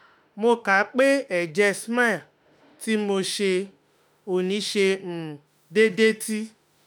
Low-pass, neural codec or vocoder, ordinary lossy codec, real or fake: none; autoencoder, 48 kHz, 32 numbers a frame, DAC-VAE, trained on Japanese speech; none; fake